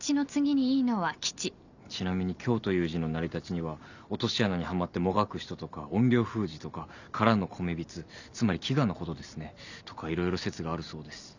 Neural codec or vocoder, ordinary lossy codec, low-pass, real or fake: none; none; 7.2 kHz; real